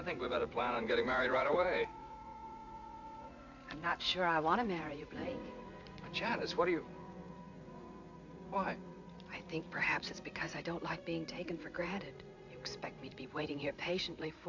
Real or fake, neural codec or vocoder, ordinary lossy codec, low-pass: fake; vocoder, 44.1 kHz, 80 mel bands, Vocos; AAC, 48 kbps; 7.2 kHz